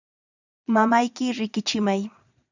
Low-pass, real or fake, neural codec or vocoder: 7.2 kHz; fake; vocoder, 22.05 kHz, 80 mel bands, Vocos